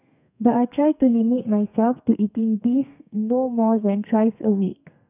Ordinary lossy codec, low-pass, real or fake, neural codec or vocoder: none; 3.6 kHz; fake; codec, 32 kHz, 1.9 kbps, SNAC